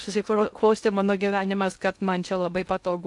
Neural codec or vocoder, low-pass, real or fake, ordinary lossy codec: codec, 16 kHz in and 24 kHz out, 0.6 kbps, FocalCodec, streaming, 2048 codes; 10.8 kHz; fake; AAC, 64 kbps